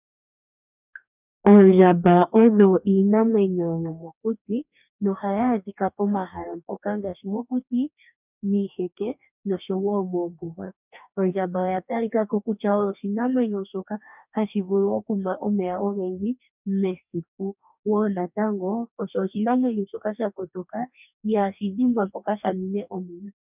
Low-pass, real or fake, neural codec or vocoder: 3.6 kHz; fake; codec, 44.1 kHz, 2.6 kbps, DAC